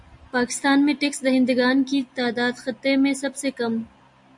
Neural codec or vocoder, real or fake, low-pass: none; real; 10.8 kHz